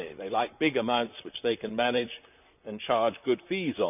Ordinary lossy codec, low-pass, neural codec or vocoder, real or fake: none; 3.6 kHz; vocoder, 44.1 kHz, 128 mel bands, Pupu-Vocoder; fake